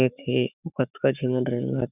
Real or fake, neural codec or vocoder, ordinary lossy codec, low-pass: fake; codec, 44.1 kHz, 7.8 kbps, Pupu-Codec; none; 3.6 kHz